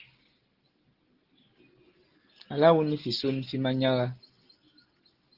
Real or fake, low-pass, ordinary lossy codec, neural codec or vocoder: real; 5.4 kHz; Opus, 16 kbps; none